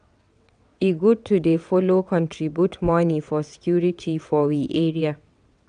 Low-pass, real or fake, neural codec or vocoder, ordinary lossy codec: 9.9 kHz; fake; vocoder, 22.05 kHz, 80 mel bands, WaveNeXt; none